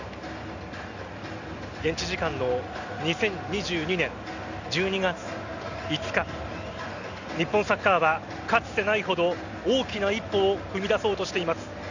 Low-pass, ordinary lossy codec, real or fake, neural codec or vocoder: 7.2 kHz; none; real; none